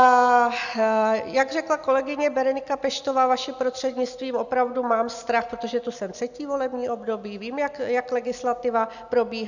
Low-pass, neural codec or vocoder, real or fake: 7.2 kHz; none; real